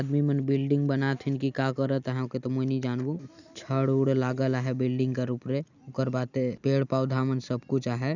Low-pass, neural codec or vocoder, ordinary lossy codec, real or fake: 7.2 kHz; none; none; real